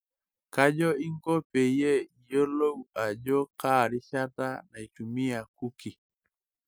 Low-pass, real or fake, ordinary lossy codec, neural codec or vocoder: none; real; none; none